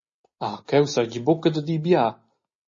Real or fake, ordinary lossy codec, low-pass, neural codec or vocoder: real; MP3, 32 kbps; 7.2 kHz; none